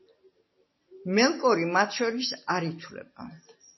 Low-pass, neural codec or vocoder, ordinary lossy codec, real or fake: 7.2 kHz; none; MP3, 24 kbps; real